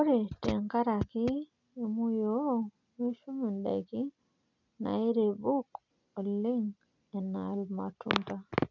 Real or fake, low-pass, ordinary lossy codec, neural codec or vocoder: real; 7.2 kHz; none; none